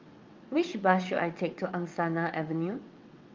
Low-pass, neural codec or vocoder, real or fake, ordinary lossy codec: 7.2 kHz; vocoder, 22.05 kHz, 80 mel bands, WaveNeXt; fake; Opus, 24 kbps